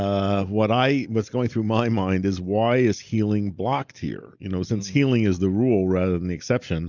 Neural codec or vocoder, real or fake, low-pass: none; real; 7.2 kHz